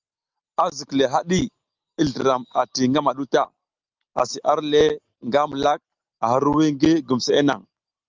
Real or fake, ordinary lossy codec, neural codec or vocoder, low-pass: real; Opus, 32 kbps; none; 7.2 kHz